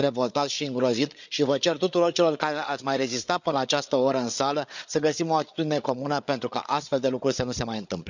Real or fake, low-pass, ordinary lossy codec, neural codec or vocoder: fake; 7.2 kHz; none; codec, 16 kHz, 8 kbps, FreqCodec, larger model